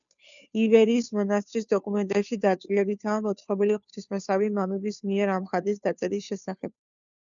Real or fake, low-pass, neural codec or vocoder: fake; 7.2 kHz; codec, 16 kHz, 2 kbps, FunCodec, trained on Chinese and English, 25 frames a second